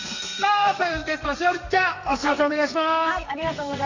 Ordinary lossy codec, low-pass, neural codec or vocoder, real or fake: AAC, 48 kbps; 7.2 kHz; codec, 32 kHz, 1.9 kbps, SNAC; fake